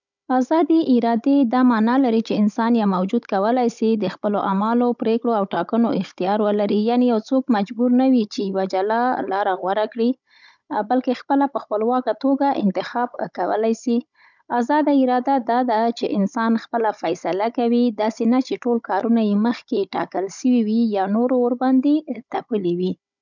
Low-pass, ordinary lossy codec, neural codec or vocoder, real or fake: 7.2 kHz; none; codec, 16 kHz, 16 kbps, FunCodec, trained on Chinese and English, 50 frames a second; fake